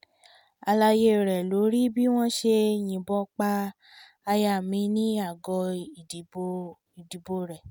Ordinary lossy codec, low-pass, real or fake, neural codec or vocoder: none; 19.8 kHz; real; none